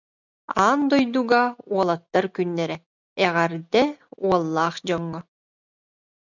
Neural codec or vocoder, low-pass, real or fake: none; 7.2 kHz; real